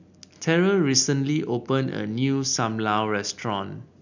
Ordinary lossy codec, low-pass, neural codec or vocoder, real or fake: none; 7.2 kHz; none; real